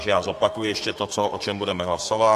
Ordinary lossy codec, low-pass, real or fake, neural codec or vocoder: AAC, 64 kbps; 14.4 kHz; fake; codec, 32 kHz, 1.9 kbps, SNAC